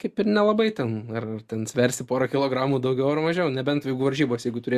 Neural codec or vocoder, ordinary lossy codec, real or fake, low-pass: none; Opus, 64 kbps; real; 14.4 kHz